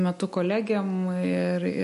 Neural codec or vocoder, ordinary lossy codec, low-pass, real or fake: none; MP3, 48 kbps; 14.4 kHz; real